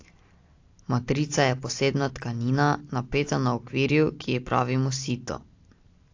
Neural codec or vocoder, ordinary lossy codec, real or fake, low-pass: none; AAC, 48 kbps; real; 7.2 kHz